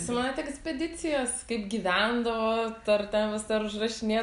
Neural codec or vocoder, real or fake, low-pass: none; real; 10.8 kHz